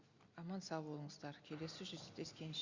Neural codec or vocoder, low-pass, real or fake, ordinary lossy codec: none; 7.2 kHz; real; Opus, 64 kbps